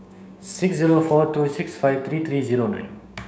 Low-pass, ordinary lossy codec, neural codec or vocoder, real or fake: none; none; codec, 16 kHz, 6 kbps, DAC; fake